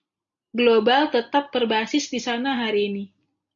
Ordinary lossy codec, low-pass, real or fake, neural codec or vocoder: MP3, 64 kbps; 7.2 kHz; real; none